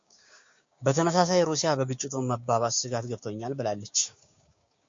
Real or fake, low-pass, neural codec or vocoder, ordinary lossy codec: fake; 7.2 kHz; codec, 16 kHz, 6 kbps, DAC; MP3, 48 kbps